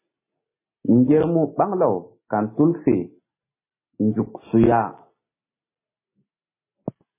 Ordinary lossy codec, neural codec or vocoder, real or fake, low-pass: MP3, 16 kbps; none; real; 3.6 kHz